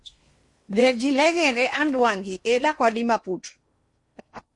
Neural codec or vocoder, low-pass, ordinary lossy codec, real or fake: codec, 16 kHz in and 24 kHz out, 0.8 kbps, FocalCodec, streaming, 65536 codes; 10.8 kHz; MP3, 48 kbps; fake